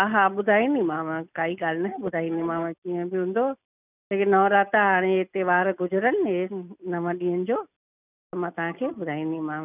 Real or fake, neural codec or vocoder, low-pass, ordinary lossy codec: real; none; 3.6 kHz; none